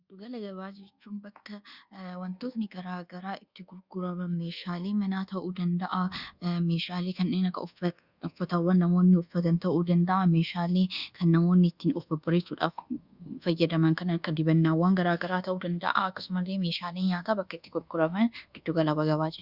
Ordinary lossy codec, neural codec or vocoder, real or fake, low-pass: Opus, 64 kbps; codec, 24 kHz, 1.2 kbps, DualCodec; fake; 5.4 kHz